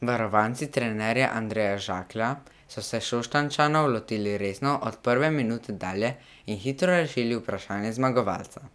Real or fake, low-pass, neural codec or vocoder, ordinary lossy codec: real; none; none; none